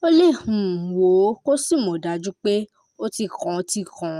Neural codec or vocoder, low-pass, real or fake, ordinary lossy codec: none; 14.4 kHz; real; Opus, 32 kbps